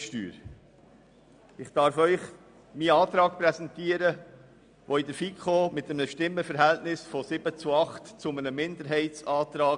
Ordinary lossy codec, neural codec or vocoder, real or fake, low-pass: MP3, 96 kbps; none; real; 9.9 kHz